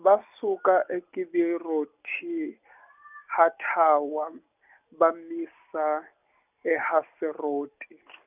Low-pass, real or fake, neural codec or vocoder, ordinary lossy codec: 3.6 kHz; real; none; none